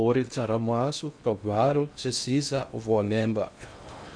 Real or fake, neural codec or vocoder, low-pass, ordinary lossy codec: fake; codec, 16 kHz in and 24 kHz out, 0.6 kbps, FocalCodec, streaming, 2048 codes; 9.9 kHz; MP3, 64 kbps